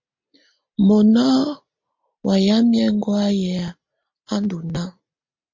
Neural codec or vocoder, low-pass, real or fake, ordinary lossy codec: none; 7.2 kHz; real; MP3, 48 kbps